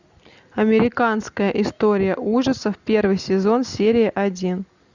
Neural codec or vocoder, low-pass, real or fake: none; 7.2 kHz; real